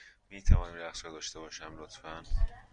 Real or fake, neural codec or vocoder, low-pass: real; none; 9.9 kHz